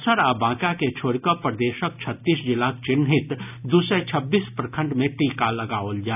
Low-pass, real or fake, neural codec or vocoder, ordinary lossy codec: 3.6 kHz; real; none; none